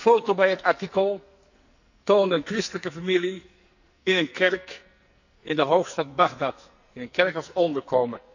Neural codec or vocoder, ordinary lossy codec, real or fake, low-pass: codec, 44.1 kHz, 2.6 kbps, SNAC; none; fake; 7.2 kHz